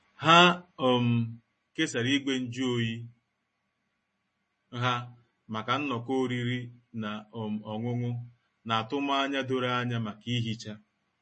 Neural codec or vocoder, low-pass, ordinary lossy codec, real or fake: none; 10.8 kHz; MP3, 32 kbps; real